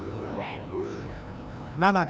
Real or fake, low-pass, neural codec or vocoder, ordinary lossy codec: fake; none; codec, 16 kHz, 1 kbps, FreqCodec, larger model; none